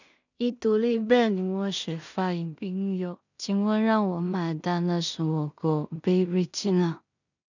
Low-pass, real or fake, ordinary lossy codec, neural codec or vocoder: 7.2 kHz; fake; none; codec, 16 kHz in and 24 kHz out, 0.4 kbps, LongCat-Audio-Codec, two codebook decoder